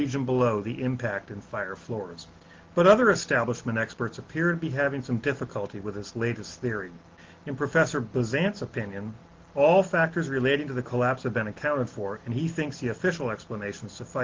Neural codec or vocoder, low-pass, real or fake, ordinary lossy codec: none; 7.2 kHz; real; Opus, 16 kbps